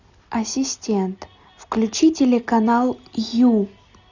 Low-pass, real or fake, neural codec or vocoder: 7.2 kHz; real; none